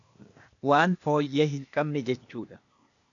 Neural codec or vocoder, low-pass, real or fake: codec, 16 kHz, 0.8 kbps, ZipCodec; 7.2 kHz; fake